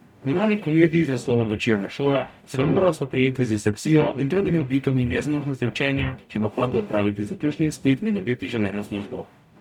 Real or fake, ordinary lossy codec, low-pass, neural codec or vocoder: fake; none; 19.8 kHz; codec, 44.1 kHz, 0.9 kbps, DAC